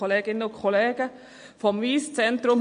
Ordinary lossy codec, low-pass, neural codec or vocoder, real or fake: MP3, 48 kbps; 9.9 kHz; none; real